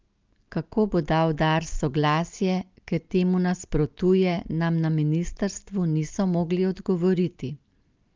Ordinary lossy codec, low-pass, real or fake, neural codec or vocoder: Opus, 24 kbps; 7.2 kHz; real; none